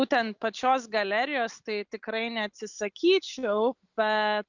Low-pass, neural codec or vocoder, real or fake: 7.2 kHz; none; real